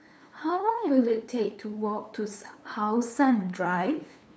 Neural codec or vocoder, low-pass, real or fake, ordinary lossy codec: codec, 16 kHz, 2 kbps, FunCodec, trained on LibriTTS, 25 frames a second; none; fake; none